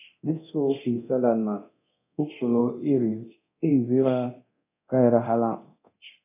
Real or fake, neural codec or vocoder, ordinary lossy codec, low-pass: fake; codec, 24 kHz, 0.9 kbps, DualCodec; AAC, 24 kbps; 3.6 kHz